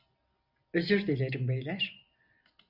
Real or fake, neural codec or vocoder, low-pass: real; none; 5.4 kHz